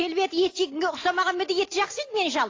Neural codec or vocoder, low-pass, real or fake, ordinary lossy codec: none; 7.2 kHz; real; AAC, 32 kbps